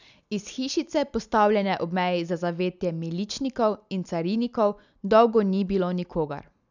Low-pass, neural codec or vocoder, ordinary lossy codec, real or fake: 7.2 kHz; none; none; real